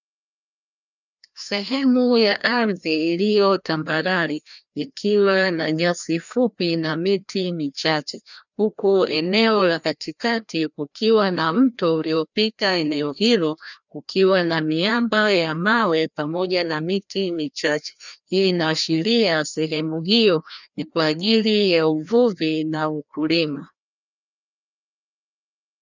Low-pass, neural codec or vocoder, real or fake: 7.2 kHz; codec, 16 kHz, 1 kbps, FreqCodec, larger model; fake